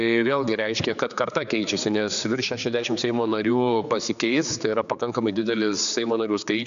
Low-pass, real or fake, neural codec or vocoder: 7.2 kHz; fake; codec, 16 kHz, 4 kbps, X-Codec, HuBERT features, trained on general audio